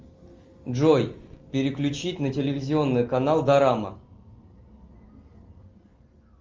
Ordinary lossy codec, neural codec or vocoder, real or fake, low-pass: Opus, 32 kbps; none; real; 7.2 kHz